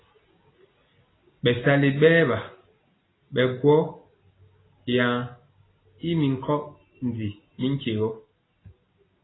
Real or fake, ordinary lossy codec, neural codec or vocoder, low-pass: real; AAC, 16 kbps; none; 7.2 kHz